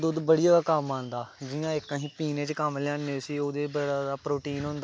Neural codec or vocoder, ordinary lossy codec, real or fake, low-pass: none; none; real; none